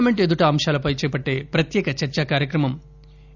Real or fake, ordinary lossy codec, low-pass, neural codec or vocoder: real; none; 7.2 kHz; none